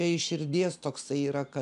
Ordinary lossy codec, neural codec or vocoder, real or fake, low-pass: MP3, 96 kbps; none; real; 10.8 kHz